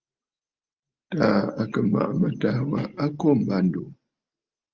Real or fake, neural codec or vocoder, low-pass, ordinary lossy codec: real; none; 7.2 kHz; Opus, 32 kbps